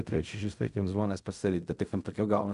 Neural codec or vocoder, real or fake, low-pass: codec, 16 kHz in and 24 kHz out, 0.4 kbps, LongCat-Audio-Codec, fine tuned four codebook decoder; fake; 10.8 kHz